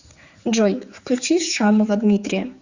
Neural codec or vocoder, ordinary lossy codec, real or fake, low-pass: codec, 16 kHz, 4 kbps, X-Codec, HuBERT features, trained on general audio; Opus, 64 kbps; fake; 7.2 kHz